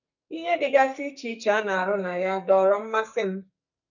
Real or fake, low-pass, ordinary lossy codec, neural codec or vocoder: fake; 7.2 kHz; none; codec, 44.1 kHz, 2.6 kbps, SNAC